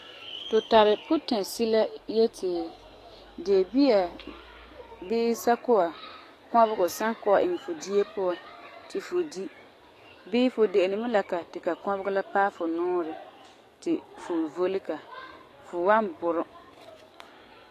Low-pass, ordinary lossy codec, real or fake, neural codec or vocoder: 14.4 kHz; AAC, 48 kbps; fake; codec, 44.1 kHz, 7.8 kbps, DAC